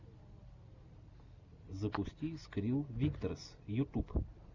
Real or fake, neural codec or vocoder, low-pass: real; none; 7.2 kHz